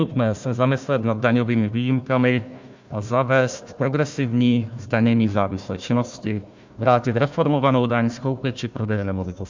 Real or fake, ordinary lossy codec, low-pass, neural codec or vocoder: fake; MP3, 64 kbps; 7.2 kHz; codec, 16 kHz, 1 kbps, FunCodec, trained on Chinese and English, 50 frames a second